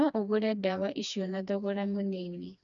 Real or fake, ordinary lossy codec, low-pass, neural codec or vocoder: fake; none; 7.2 kHz; codec, 16 kHz, 2 kbps, FreqCodec, smaller model